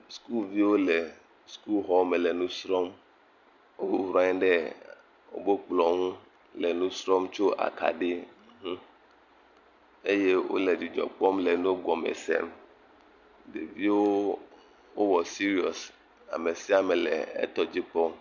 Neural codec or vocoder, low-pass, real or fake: none; 7.2 kHz; real